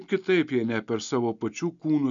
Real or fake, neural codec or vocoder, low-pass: real; none; 7.2 kHz